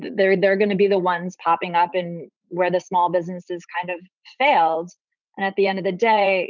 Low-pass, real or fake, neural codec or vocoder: 7.2 kHz; fake; vocoder, 44.1 kHz, 80 mel bands, Vocos